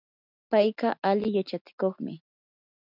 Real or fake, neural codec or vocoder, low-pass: real; none; 5.4 kHz